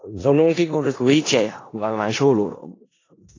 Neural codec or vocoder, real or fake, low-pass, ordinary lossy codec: codec, 16 kHz in and 24 kHz out, 0.4 kbps, LongCat-Audio-Codec, four codebook decoder; fake; 7.2 kHz; AAC, 32 kbps